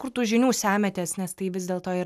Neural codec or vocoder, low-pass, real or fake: none; 14.4 kHz; real